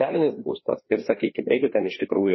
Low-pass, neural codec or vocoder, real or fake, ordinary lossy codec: 7.2 kHz; codec, 16 kHz, 2 kbps, FreqCodec, larger model; fake; MP3, 24 kbps